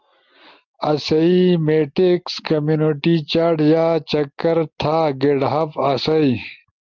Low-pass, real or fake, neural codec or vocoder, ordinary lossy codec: 7.2 kHz; real; none; Opus, 24 kbps